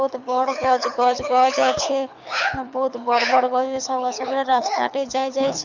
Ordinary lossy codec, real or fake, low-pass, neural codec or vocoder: none; fake; 7.2 kHz; codec, 24 kHz, 6 kbps, HILCodec